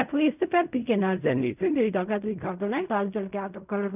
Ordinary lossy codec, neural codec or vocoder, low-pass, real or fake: none; codec, 16 kHz in and 24 kHz out, 0.4 kbps, LongCat-Audio-Codec, fine tuned four codebook decoder; 3.6 kHz; fake